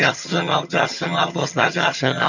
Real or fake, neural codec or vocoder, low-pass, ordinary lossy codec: fake; vocoder, 22.05 kHz, 80 mel bands, HiFi-GAN; 7.2 kHz; none